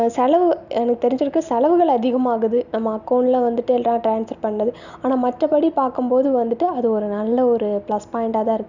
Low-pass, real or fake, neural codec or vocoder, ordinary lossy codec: 7.2 kHz; real; none; none